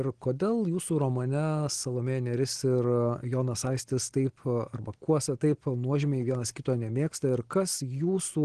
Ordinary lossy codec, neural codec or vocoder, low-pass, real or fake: Opus, 16 kbps; none; 9.9 kHz; real